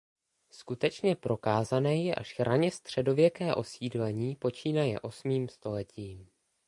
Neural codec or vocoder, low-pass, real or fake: none; 10.8 kHz; real